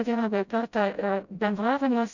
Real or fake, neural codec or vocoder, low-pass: fake; codec, 16 kHz, 0.5 kbps, FreqCodec, smaller model; 7.2 kHz